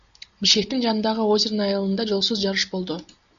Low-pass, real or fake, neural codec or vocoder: 7.2 kHz; real; none